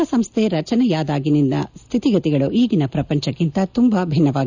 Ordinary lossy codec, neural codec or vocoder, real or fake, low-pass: none; none; real; 7.2 kHz